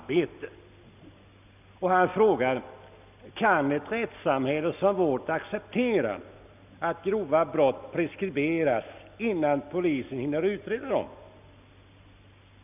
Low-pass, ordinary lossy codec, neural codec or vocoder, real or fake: 3.6 kHz; none; none; real